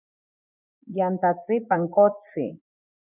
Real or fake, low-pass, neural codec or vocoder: fake; 3.6 kHz; vocoder, 24 kHz, 100 mel bands, Vocos